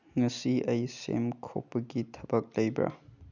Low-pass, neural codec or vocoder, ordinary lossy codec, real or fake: 7.2 kHz; none; none; real